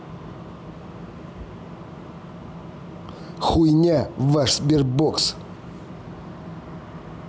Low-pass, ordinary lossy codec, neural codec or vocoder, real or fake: none; none; none; real